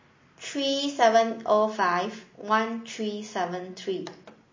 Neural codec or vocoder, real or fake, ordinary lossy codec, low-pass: none; real; MP3, 32 kbps; 7.2 kHz